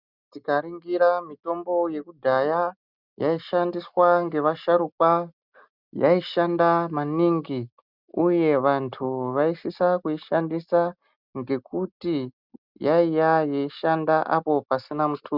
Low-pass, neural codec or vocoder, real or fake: 5.4 kHz; none; real